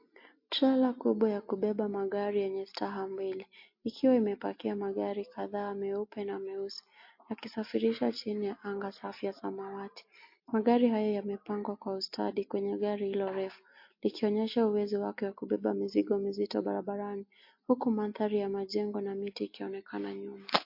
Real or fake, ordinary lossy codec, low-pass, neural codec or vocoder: real; MP3, 32 kbps; 5.4 kHz; none